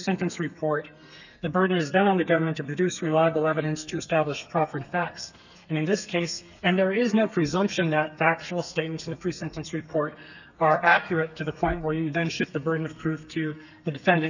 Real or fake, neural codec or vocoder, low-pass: fake; codec, 32 kHz, 1.9 kbps, SNAC; 7.2 kHz